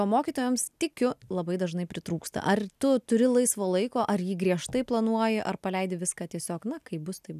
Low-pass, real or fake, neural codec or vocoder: 14.4 kHz; real; none